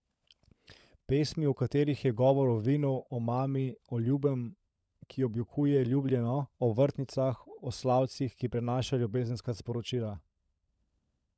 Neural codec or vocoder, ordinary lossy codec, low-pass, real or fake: codec, 16 kHz, 16 kbps, FunCodec, trained on LibriTTS, 50 frames a second; none; none; fake